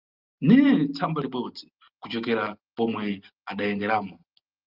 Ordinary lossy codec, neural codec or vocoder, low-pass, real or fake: Opus, 24 kbps; none; 5.4 kHz; real